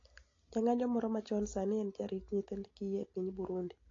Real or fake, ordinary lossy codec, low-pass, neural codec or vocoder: real; AAC, 32 kbps; 7.2 kHz; none